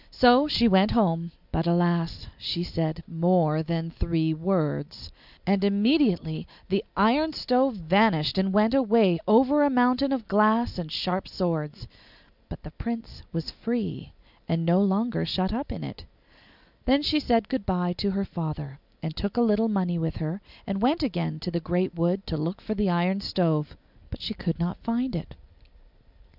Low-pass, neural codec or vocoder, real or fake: 5.4 kHz; none; real